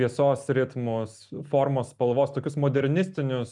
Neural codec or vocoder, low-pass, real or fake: none; 10.8 kHz; real